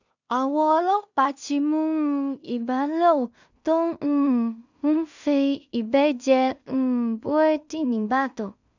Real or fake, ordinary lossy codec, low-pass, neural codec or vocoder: fake; none; 7.2 kHz; codec, 16 kHz in and 24 kHz out, 0.4 kbps, LongCat-Audio-Codec, two codebook decoder